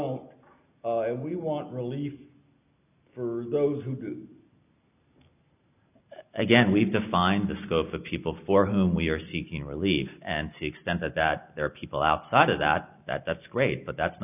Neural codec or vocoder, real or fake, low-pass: none; real; 3.6 kHz